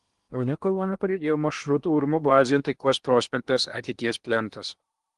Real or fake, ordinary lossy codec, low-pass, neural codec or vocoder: fake; Opus, 24 kbps; 10.8 kHz; codec, 16 kHz in and 24 kHz out, 0.8 kbps, FocalCodec, streaming, 65536 codes